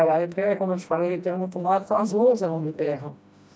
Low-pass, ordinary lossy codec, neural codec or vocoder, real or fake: none; none; codec, 16 kHz, 1 kbps, FreqCodec, smaller model; fake